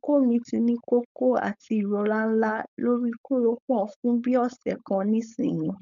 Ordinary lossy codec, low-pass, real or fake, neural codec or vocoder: none; 7.2 kHz; fake; codec, 16 kHz, 4.8 kbps, FACodec